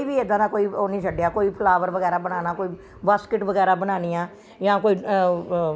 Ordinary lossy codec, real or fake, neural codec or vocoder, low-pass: none; real; none; none